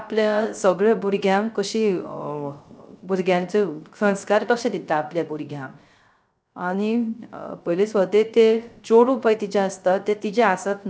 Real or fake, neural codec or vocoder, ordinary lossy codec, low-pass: fake; codec, 16 kHz, 0.3 kbps, FocalCodec; none; none